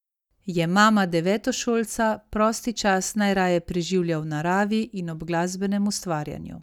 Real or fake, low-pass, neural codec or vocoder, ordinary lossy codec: real; 19.8 kHz; none; none